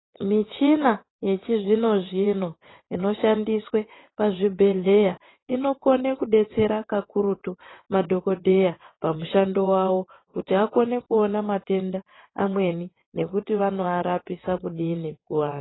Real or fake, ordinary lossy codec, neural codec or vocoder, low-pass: fake; AAC, 16 kbps; vocoder, 22.05 kHz, 80 mel bands, WaveNeXt; 7.2 kHz